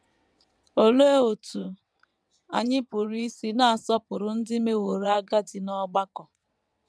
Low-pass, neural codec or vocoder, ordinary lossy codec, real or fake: none; vocoder, 22.05 kHz, 80 mel bands, WaveNeXt; none; fake